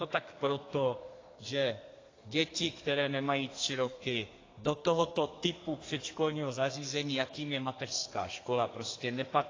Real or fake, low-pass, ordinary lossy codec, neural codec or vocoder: fake; 7.2 kHz; AAC, 32 kbps; codec, 32 kHz, 1.9 kbps, SNAC